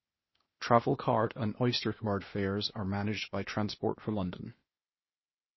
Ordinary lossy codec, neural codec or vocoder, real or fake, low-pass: MP3, 24 kbps; codec, 16 kHz, 0.8 kbps, ZipCodec; fake; 7.2 kHz